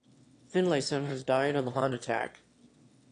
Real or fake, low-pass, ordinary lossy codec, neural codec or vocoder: fake; 9.9 kHz; AAC, 48 kbps; autoencoder, 22.05 kHz, a latent of 192 numbers a frame, VITS, trained on one speaker